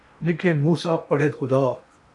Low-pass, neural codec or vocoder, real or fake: 10.8 kHz; codec, 16 kHz in and 24 kHz out, 0.8 kbps, FocalCodec, streaming, 65536 codes; fake